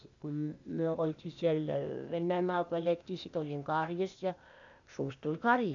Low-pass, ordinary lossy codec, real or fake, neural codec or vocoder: 7.2 kHz; none; fake; codec, 16 kHz, 0.8 kbps, ZipCodec